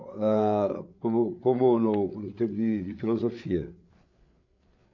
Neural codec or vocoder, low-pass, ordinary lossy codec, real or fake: codec, 16 kHz, 8 kbps, FreqCodec, larger model; 7.2 kHz; MP3, 48 kbps; fake